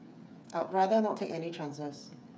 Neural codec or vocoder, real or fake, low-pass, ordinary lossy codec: codec, 16 kHz, 8 kbps, FreqCodec, smaller model; fake; none; none